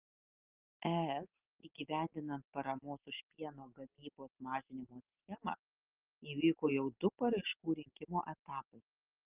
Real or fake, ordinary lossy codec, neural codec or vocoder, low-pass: real; Opus, 32 kbps; none; 3.6 kHz